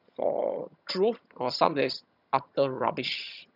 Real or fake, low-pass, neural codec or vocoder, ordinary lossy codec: fake; 5.4 kHz; vocoder, 22.05 kHz, 80 mel bands, HiFi-GAN; none